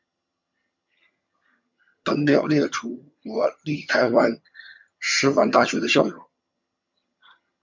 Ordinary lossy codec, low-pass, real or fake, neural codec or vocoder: MP3, 64 kbps; 7.2 kHz; fake; vocoder, 22.05 kHz, 80 mel bands, HiFi-GAN